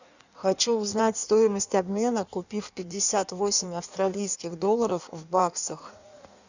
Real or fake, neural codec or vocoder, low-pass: fake; codec, 16 kHz in and 24 kHz out, 1.1 kbps, FireRedTTS-2 codec; 7.2 kHz